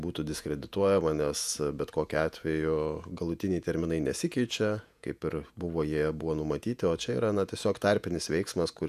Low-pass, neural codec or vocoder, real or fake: 14.4 kHz; vocoder, 48 kHz, 128 mel bands, Vocos; fake